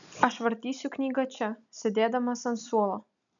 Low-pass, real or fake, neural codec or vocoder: 7.2 kHz; real; none